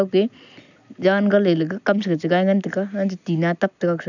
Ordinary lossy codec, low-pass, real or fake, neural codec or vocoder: none; 7.2 kHz; real; none